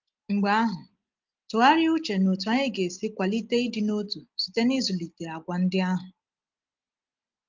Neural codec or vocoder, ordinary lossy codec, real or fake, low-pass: none; Opus, 32 kbps; real; 7.2 kHz